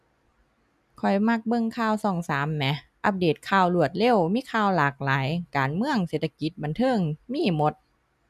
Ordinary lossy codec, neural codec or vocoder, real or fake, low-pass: none; none; real; 14.4 kHz